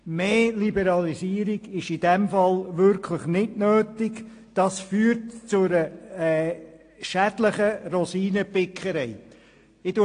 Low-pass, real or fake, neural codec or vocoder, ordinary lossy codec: 9.9 kHz; real; none; AAC, 48 kbps